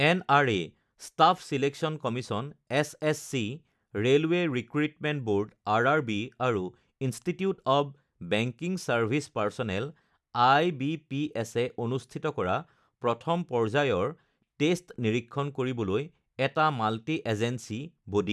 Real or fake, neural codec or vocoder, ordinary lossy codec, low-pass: real; none; none; none